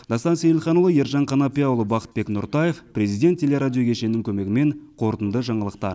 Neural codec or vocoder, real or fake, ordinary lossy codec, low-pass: none; real; none; none